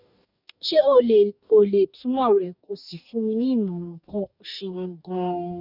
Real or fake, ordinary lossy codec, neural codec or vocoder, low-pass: fake; none; codec, 44.1 kHz, 2.6 kbps, DAC; 5.4 kHz